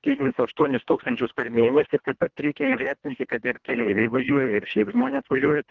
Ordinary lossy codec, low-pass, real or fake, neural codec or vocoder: Opus, 24 kbps; 7.2 kHz; fake; codec, 24 kHz, 1.5 kbps, HILCodec